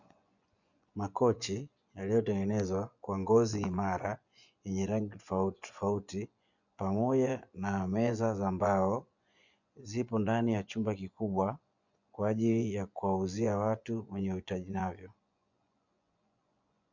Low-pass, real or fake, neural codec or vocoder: 7.2 kHz; fake; vocoder, 24 kHz, 100 mel bands, Vocos